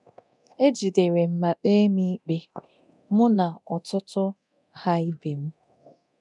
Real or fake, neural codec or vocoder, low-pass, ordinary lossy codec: fake; codec, 24 kHz, 0.9 kbps, DualCodec; 10.8 kHz; none